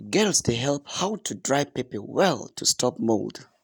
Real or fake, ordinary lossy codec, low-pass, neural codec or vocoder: real; none; none; none